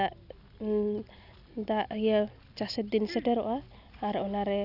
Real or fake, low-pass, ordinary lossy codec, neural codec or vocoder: real; 5.4 kHz; none; none